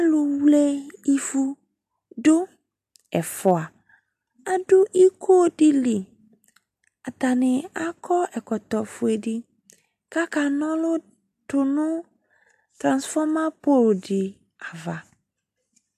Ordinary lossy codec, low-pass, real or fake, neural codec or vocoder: AAC, 96 kbps; 14.4 kHz; real; none